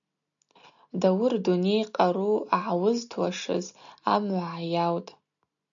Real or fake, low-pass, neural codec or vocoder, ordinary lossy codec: real; 7.2 kHz; none; MP3, 96 kbps